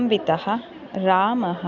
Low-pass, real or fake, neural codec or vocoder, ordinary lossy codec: 7.2 kHz; real; none; none